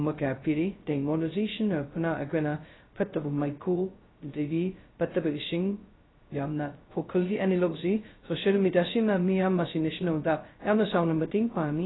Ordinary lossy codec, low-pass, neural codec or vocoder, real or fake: AAC, 16 kbps; 7.2 kHz; codec, 16 kHz, 0.2 kbps, FocalCodec; fake